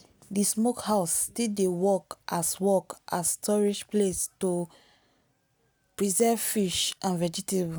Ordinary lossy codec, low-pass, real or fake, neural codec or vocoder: none; none; real; none